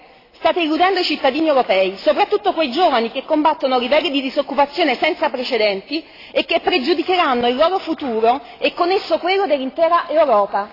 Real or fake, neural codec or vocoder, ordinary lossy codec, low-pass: real; none; AAC, 24 kbps; 5.4 kHz